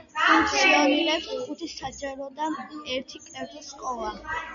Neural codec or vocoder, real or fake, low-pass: none; real; 7.2 kHz